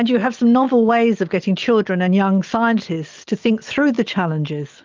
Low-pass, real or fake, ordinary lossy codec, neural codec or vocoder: 7.2 kHz; real; Opus, 24 kbps; none